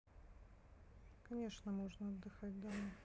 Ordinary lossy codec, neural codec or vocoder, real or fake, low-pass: none; none; real; none